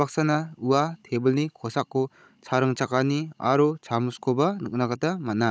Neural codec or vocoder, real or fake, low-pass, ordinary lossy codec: codec, 16 kHz, 16 kbps, FunCodec, trained on Chinese and English, 50 frames a second; fake; none; none